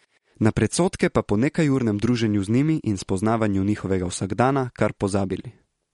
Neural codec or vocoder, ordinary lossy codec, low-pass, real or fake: none; MP3, 48 kbps; 19.8 kHz; real